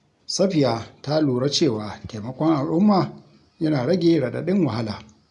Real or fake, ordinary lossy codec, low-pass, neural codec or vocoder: real; MP3, 96 kbps; 10.8 kHz; none